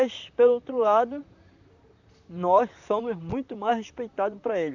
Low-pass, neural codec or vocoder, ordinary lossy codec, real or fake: 7.2 kHz; vocoder, 44.1 kHz, 128 mel bands, Pupu-Vocoder; none; fake